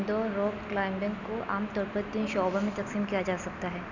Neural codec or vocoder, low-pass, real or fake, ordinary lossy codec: none; 7.2 kHz; real; none